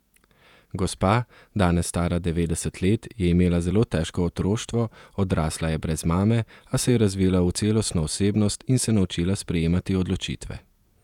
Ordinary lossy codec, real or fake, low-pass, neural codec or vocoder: none; fake; 19.8 kHz; vocoder, 48 kHz, 128 mel bands, Vocos